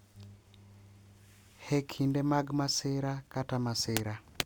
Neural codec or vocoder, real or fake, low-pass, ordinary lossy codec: none; real; 19.8 kHz; none